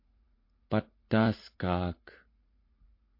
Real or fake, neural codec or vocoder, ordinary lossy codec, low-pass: fake; vocoder, 44.1 kHz, 80 mel bands, Vocos; MP3, 32 kbps; 5.4 kHz